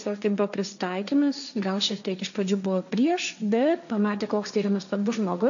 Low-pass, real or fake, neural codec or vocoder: 7.2 kHz; fake; codec, 16 kHz, 1.1 kbps, Voila-Tokenizer